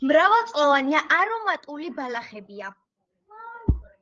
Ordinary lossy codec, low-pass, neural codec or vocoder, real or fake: Opus, 24 kbps; 7.2 kHz; codec, 16 kHz, 8 kbps, FreqCodec, larger model; fake